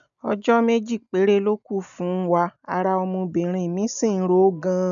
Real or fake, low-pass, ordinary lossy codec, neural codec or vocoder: real; 7.2 kHz; none; none